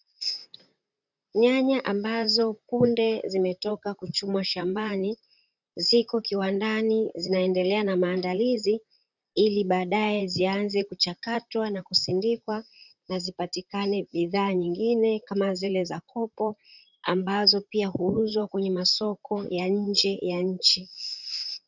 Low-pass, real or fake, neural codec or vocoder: 7.2 kHz; fake; vocoder, 44.1 kHz, 128 mel bands, Pupu-Vocoder